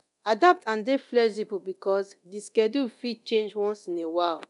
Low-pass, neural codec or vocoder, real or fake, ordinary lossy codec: 10.8 kHz; codec, 24 kHz, 0.9 kbps, DualCodec; fake; none